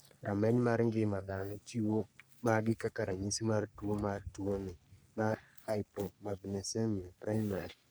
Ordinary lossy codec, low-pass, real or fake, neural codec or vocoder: none; none; fake; codec, 44.1 kHz, 3.4 kbps, Pupu-Codec